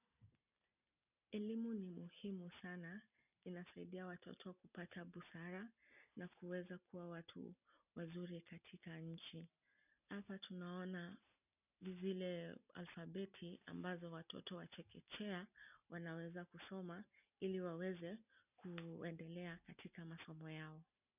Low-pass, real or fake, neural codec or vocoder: 3.6 kHz; real; none